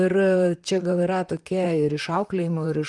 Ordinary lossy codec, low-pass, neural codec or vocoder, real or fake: Opus, 32 kbps; 10.8 kHz; vocoder, 44.1 kHz, 128 mel bands, Pupu-Vocoder; fake